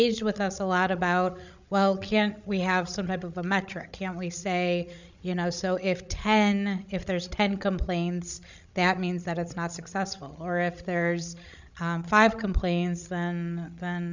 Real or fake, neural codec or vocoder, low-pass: fake; codec, 16 kHz, 16 kbps, FreqCodec, larger model; 7.2 kHz